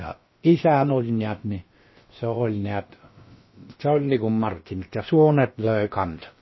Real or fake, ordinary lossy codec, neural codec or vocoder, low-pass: fake; MP3, 24 kbps; codec, 16 kHz, about 1 kbps, DyCAST, with the encoder's durations; 7.2 kHz